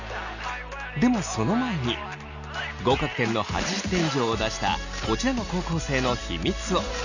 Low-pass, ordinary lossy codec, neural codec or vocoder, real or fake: 7.2 kHz; none; none; real